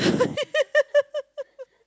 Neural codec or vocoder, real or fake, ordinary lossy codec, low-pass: none; real; none; none